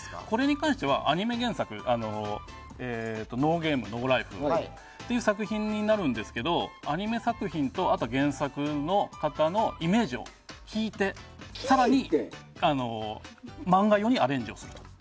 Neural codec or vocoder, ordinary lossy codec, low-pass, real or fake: none; none; none; real